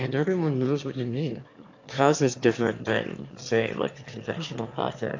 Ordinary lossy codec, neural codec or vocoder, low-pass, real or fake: AAC, 48 kbps; autoencoder, 22.05 kHz, a latent of 192 numbers a frame, VITS, trained on one speaker; 7.2 kHz; fake